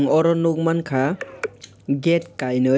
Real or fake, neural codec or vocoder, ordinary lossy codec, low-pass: real; none; none; none